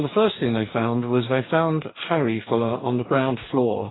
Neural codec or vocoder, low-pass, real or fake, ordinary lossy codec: codec, 44.1 kHz, 2.6 kbps, DAC; 7.2 kHz; fake; AAC, 16 kbps